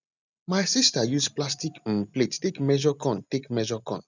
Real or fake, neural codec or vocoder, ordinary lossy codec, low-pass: real; none; none; 7.2 kHz